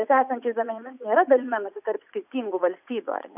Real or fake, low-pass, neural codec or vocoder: fake; 3.6 kHz; vocoder, 44.1 kHz, 80 mel bands, Vocos